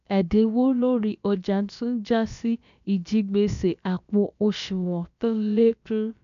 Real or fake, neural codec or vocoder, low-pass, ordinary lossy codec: fake; codec, 16 kHz, about 1 kbps, DyCAST, with the encoder's durations; 7.2 kHz; none